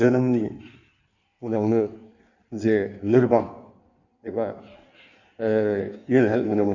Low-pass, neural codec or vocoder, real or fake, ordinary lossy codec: 7.2 kHz; codec, 16 kHz in and 24 kHz out, 1.1 kbps, FireRedTTS-2 codec; fake; none